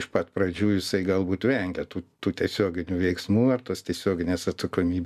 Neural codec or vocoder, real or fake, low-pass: none; real; 14.4 kHz